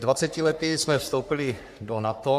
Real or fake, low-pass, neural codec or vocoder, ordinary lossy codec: fake; 14.4 kHz; codec, 44.1 kHz, 3.4 kbps, Pupu-Codec; AAC, 96 kbps